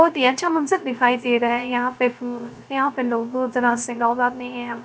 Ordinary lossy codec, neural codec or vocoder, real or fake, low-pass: none; codec, 16 kHz, 0.3 kbps, FocalCodec; fake; none